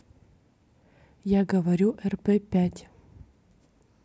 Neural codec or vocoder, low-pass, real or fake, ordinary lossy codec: none; none; real; none